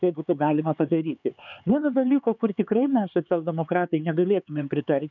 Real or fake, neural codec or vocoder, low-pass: fake; codec, 16 kHz, 4 kbps, X-Codec, HuBERT features, trained on LibriSpeech; 7.2 kHz